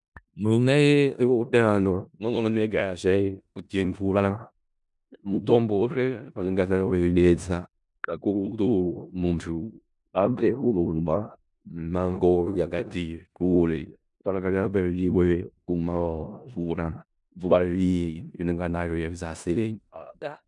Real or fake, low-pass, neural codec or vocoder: fake; 10.8 kHz; codec, 16 kHz in and 24 kHz out, 0.4 kbps, LongCat-Audio-Codec, four codebook decoder